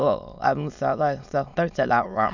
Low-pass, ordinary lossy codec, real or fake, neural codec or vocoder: 7.2 kHz; none; fake; autoencoder, 22.05 kHz, a latent of 192 numbers a frame, VITS, trained on many speakers